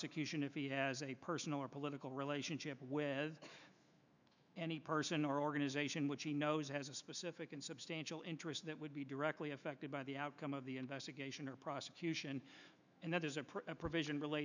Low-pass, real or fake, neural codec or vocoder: 7.2 kHz; fake; autoencoder, 48 kHz, 128 numbers a frame, DAC-VAE, trained on Japanese speech